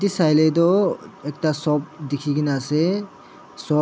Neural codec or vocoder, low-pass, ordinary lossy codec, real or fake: none; none; none; real